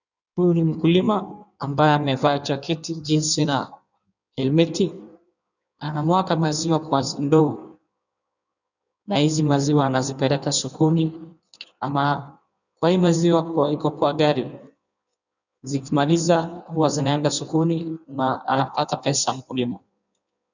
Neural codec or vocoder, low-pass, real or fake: codec, 16 kHz in and 24 kHz out, 1.1 kbps, FireRedTTS-2 codec; 7.2 kHz; fake